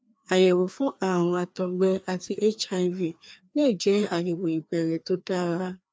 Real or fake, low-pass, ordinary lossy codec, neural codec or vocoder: fake; none; none; codec, 16 kHz, 2 kbps, FreqCodec, larger model